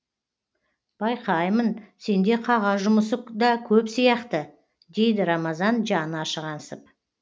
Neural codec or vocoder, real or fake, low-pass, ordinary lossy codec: none; real; none; none